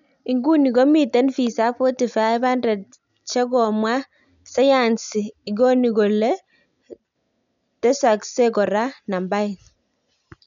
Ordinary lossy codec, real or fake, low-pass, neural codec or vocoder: none; real; 7.2 kHz; none